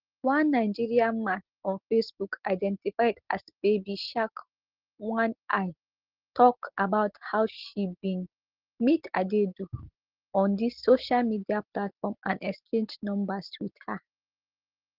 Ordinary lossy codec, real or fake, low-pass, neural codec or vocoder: Opus, 16 kbps; real; 5.4 kHz; none